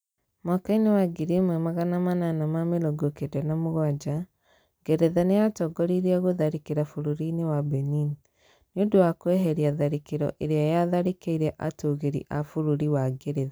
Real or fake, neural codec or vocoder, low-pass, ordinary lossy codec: real; none; none; none